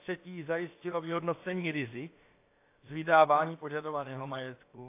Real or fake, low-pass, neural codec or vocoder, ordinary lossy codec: fake; 3.6 kHz; codec, 16 kHz, about 1 kbps, DyCAST, with the encoder's durations; AAC, 24 kbps